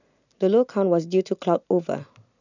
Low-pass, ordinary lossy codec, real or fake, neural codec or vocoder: 7.2 kHz; none; real; none